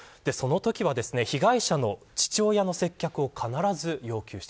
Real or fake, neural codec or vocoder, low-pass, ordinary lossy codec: real; none; none; none